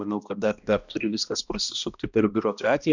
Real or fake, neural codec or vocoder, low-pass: fake; codec, 16 kHz, 1 kbps, X-Codec, HuBERT features, trained on balanced general audio; 7.2 kHz